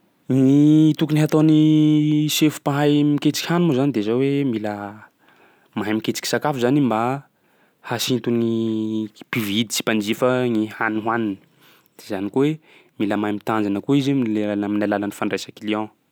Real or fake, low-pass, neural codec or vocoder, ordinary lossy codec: real; none; none; none